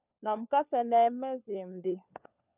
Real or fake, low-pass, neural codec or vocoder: fake; 3.6 kHz; codec, 16 kHz, 4 kbps, FunCodec, trained on LibriTTS, 50 frames a second